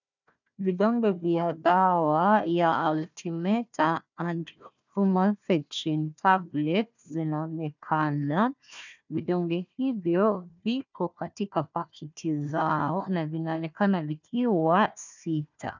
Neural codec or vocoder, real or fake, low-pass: codec, 16 kHz, 1 kbps, FunCodec, trained on Chinese and English, 50 frames a second; fake; 7.2 kHz